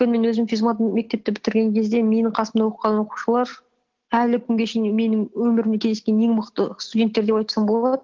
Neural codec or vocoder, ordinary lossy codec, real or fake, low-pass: none; Opus, 16 kbps; real; 7.2 kHz